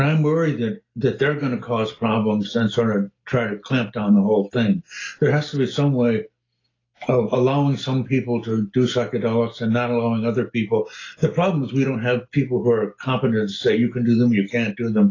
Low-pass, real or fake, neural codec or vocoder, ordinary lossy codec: 7.2 kHz; real; none; AAC, 32 kbps